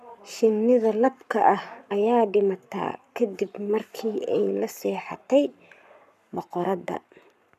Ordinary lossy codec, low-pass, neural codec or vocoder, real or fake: none; 14.4 kHz; codec, 44.1 kHz, 7.8 kbps, Pupu-Codec; fake